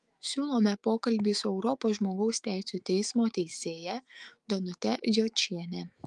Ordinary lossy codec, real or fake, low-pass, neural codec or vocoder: Opus, 32 kbps; fake; 10.8 kHz; autoencoder, 48 kHz, 128 numbers a frame, DAC-VAE, trained on Japanese speech